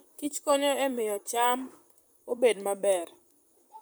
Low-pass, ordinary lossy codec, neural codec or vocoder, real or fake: none; none; vocoder, 44.1 kHz, 128 mel bands, Pupu-Vocoder; fake